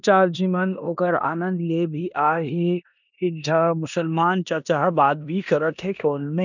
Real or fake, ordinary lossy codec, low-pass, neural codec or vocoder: fake; none; 7.2 kHz; codec, 16 kHz in and 24 kHz out, 0.9 kbps, LongCat-Audio-Codec, four codebook decoder